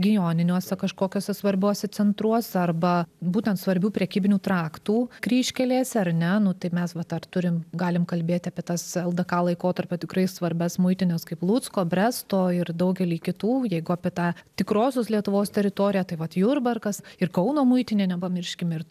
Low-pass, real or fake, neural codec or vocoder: 14.4 kHz; real; none